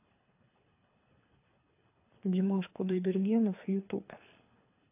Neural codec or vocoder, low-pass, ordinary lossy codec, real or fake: codec, 24 kHz, 3 kbps, HILCodec; 3.6 kHz; AAC, 24 kbps; fake